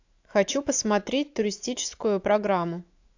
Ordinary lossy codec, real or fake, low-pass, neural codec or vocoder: AAC, 48 kbps; fake; 7.2 kHz; autoencoder, 48 kHz, 128 numbers a frame, DAC-VAE, trained on Japanese speech